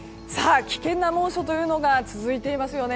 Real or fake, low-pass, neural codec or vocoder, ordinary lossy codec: real; none; none; none